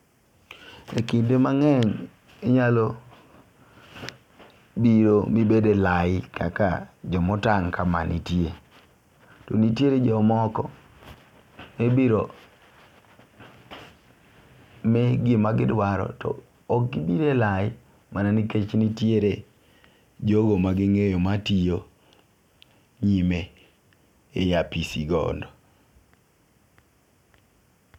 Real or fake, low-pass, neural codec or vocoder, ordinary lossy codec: real; 19.8 kHz; none; none